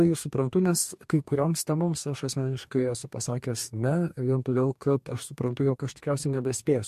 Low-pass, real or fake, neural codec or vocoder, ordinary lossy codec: 14.4 kHz; fake; codec, 44.1 kHz, 2.6 kbps, DAC; MP3, 64 kbps